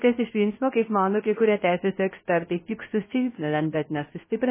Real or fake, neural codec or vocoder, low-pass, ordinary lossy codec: fake; codec, 16 kHz, 0.3 kbps, FocalCodec; 3.6 kHz; MP3, 16 kbps